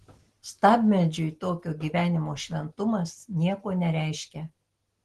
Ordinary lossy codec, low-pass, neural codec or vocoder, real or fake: Opus, 16 kbps; 10.8 kHz; none; real